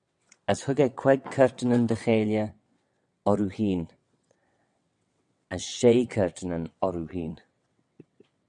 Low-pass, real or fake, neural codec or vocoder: 9.9 kHz; fake; vocoder, 22.05 kHz, 80 mel bands, WaveNeXt